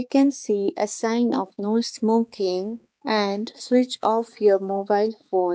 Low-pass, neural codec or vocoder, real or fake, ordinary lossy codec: none; codec, 16 kHz, 4 kbps, X-Codec, HuBERT features, trained on balanced general audio; fake; none